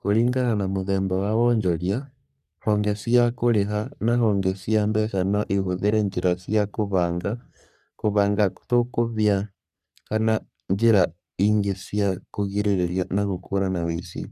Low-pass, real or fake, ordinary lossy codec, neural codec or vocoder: 14.4 kHz; fake; none; codec, 44.1 kHz, 3.4 kbps, Pupu-Codec